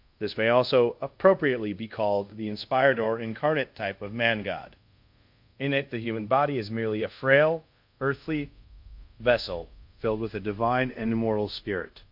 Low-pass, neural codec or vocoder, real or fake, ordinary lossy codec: 5.4 kHz; codec, 24 kHz, 0.5 kbps, DualCodec; fake; MP3, 48 kbps